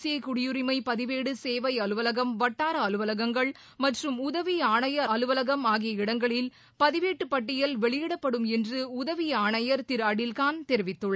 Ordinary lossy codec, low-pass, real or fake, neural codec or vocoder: none; none; real; none